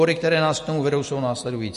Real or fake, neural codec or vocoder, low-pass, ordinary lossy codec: real; none; 14.4 kHz; MP3, 48 kbps